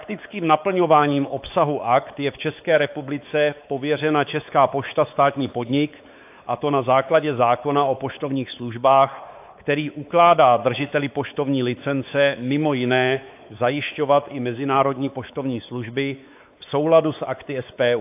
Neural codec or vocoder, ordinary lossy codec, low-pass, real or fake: codec, 16 kHz, 4 kbps, X-Codec, WavLM features, trained on Multilingual LibriSpeech; AAC, 32 kbps; 3.6 kHz; fake